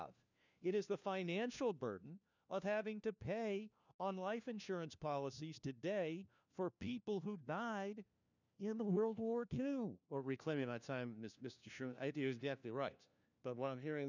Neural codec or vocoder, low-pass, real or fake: codec, 16 kHz, 1 kbps, FunCodec, trained on LibriTTS, 50 frames a second; 7.2 kHz; fake